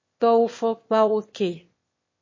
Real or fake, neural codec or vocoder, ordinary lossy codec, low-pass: fake; autoencoder, 22.05 kHz, a latent of 192 numbers a frame, VITS, trained on one speaker; MP3, 32 kbps; 7.2 kHz